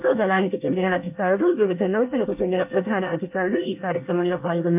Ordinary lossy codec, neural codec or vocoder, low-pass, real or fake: MP3, 32 kbps; codec, 24 kHz, 1 kbps, SNAC; 3.6 kHz; fake